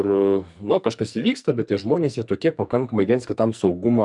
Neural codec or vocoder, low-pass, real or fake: codec, 44.1 kHz, 2.6 kbps, SNAC; 10.8 kHz; fake